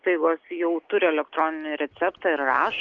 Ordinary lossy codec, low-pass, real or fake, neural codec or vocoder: Opus, 32 kbps; 7.2 kHz; real; none